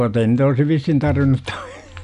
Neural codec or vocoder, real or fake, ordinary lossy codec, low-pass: none; real; none; 10.8 kHz